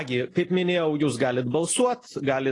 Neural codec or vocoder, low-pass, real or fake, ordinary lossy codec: none; 10.8 kHz; real; AAC, 32 kbps